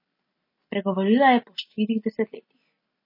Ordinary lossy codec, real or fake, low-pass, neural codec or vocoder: MP3, 24 kbps; real; 5.4 kHz; none